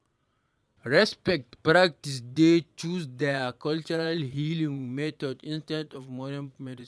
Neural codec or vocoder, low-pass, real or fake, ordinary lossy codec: vocoder, 22.05 kHz, 80 mel bands, Vocos; none; fake; none